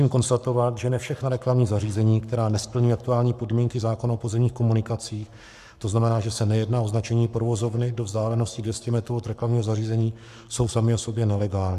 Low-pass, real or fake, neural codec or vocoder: 14.4 kHz; fake; codec, 44.1 kHz, 7.8 kbps, Pupu-Codec